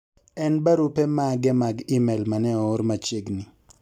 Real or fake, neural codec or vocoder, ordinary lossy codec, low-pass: real; none; none; 19.8 kHz